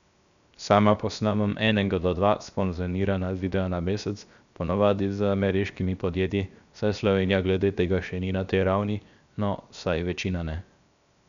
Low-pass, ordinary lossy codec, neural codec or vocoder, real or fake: 7.2 kHz; none; codec, 16 kHz, 0.7 kbps, FocalCodec; fake